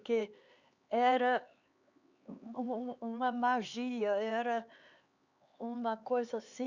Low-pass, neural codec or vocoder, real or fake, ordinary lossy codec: 7.2 kHz; codec, 16 kHz, 4 kbps, X-Codec, HuBERT features, trained on LibriSpeech; fake; Opus, 64 kbps